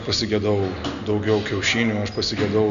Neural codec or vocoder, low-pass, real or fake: none; 7.2 kHz; real